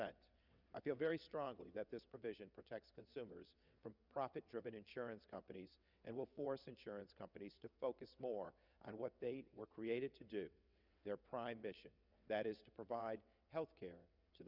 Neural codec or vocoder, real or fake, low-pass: vocoder, 22.05 kHz, 80 mel bands, WaveNeXt; fake; 5.4 kHz